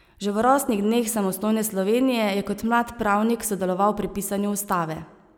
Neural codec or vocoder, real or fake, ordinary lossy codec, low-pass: none; real; none; none